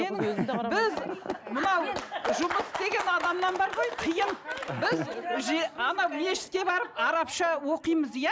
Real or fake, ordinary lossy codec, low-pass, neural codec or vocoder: real; none; none; none